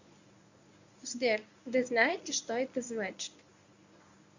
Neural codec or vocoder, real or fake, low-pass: codec, 24 kHz, 0.9 kbps, WavTokenizer, medium speech release version 1; fake; 7.2 kHz